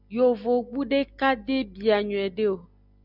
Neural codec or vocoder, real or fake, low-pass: none; real; 5.4 kHz